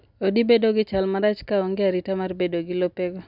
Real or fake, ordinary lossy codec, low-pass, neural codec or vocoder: real; none; 5.4 kHz; none